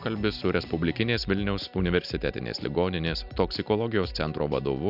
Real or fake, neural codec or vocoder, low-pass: real; none; 5.4 kHz